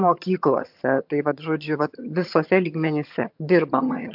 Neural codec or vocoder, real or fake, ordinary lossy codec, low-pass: vocoder, 22.05 kHz, 80 mel bands, HiFi-GAN; fake; MP3, 48 kbps; 5.4 kHz